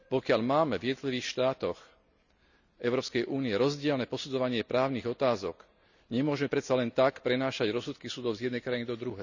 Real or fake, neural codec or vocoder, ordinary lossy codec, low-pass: real; none; none; 7.2 kHz